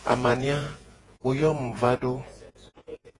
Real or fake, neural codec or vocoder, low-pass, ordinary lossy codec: fake; vocoder, 48 kHz, 128 mel bands, Vocos; 10.8 kHz; AAC, 32 kbps